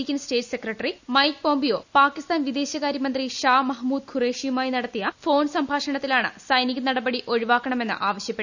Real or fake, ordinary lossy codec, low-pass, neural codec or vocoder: real; none; 7.2 kHz; none